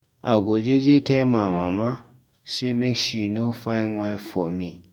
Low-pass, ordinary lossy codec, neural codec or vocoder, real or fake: 19.8 kHz; none; codec, 44.1 kHz, 2.6 kbps, DAC; fake